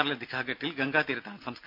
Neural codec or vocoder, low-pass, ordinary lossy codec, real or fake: none; 5.4 kHz; none; real